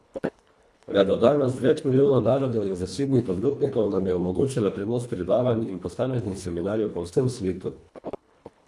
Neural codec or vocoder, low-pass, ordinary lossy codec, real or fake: codec, 24 kHz, 1.5 kbps, HILCodec; none; none; fake